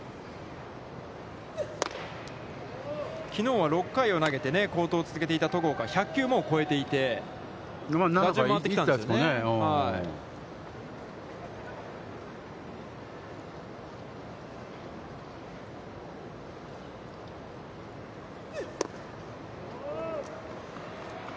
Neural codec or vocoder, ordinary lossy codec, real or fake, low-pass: none; none; real; none